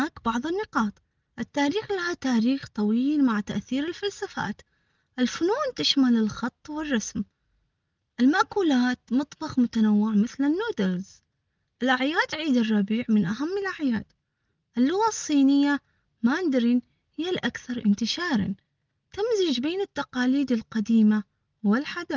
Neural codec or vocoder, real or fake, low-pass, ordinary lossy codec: none; real; 7.2 kHz; Opus, 32 kbps